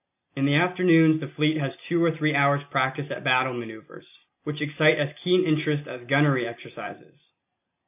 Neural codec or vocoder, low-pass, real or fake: none; 3.6 kHz; real